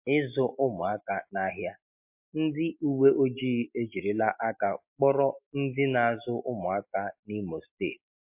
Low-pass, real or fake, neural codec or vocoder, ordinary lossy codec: 3.6 kHz; real; none; MP3, 32 kbps